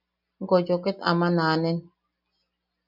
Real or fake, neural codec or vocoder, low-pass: real; none; 5.4 kHz